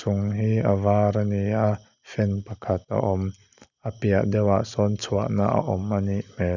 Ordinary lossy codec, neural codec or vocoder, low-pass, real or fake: none; none; 7.2 kHz; real